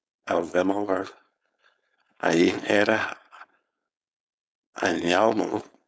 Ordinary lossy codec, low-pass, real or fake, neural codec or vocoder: none; none; fake; codec, 16 kHz, 4.8 kbps, FACodec